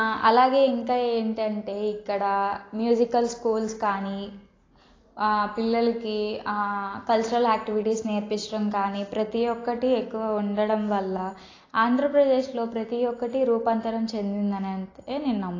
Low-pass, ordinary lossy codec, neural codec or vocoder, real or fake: 7.2 kHz; AAC, 32 kbps; none; real